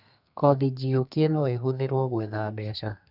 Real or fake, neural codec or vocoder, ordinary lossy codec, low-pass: fake; codec, 32 kHz, 1.9 kbps, SNAC; none; 5.4 kHz